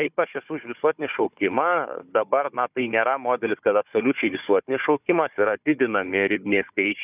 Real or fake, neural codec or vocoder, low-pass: fake; codec, 16 kHz, 4 kbps, FunCodec, trained on Chinese and English, 50 frames a second; 3.6 kHz